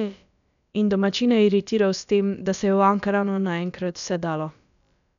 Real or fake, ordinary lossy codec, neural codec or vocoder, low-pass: fake; none; codec, 16 kHz, about 1 kbps, DyCAST, with the encoder's durations; 7.2 kHz